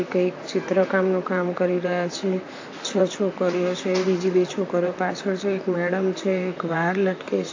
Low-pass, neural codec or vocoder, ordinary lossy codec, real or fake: 7.2 kHz; vocoder, 44.1 kHz, 128 mel bands, Pupu-Vocoder; AAC, 48 kbps; fake